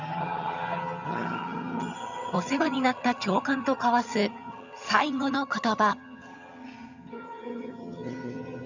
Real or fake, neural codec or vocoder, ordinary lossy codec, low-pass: fake; vocoder, 22.05 kHz, 80 mel bands, HiFi-GAN; none; 7.2 kHz